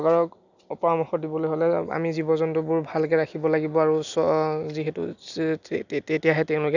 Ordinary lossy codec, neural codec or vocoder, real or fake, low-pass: none; none; real; 7.2 kHz